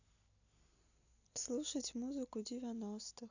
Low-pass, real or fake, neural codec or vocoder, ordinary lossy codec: 7.2 kHz; real; none; none